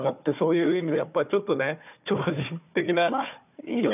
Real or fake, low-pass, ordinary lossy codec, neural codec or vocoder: fake; 3.6 kHz; none; codec, 16 kHz, 4 kbps, FunCodec, trained on Chinese and English, 50 frames a second